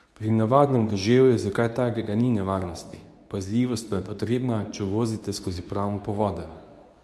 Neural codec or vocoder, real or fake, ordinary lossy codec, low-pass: codec, 24 kHz, 0.9 kbps, WavTokenizer, medium speech release version 2; fake; none; none